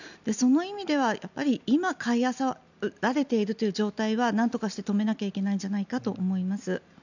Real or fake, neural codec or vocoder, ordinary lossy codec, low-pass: real; none; none; 7.2 kHz